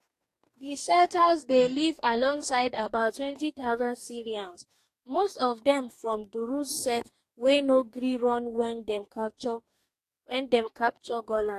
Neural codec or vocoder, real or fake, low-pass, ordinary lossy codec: codec, 44.1 kHz, 2.6 kbps, DAC; fake; 14.4 kHz; AAC, 64 kbps